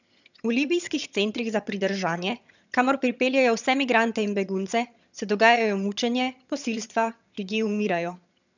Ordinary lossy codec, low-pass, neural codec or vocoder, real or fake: none; 7.2 kHz; vocoder, 22.05 kHz, 80 mel bands, HiFi-GAN; fake